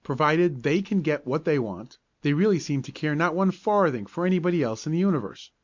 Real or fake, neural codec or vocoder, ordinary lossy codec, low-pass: real; none; MP3, 64 kbps; 7.2 kHz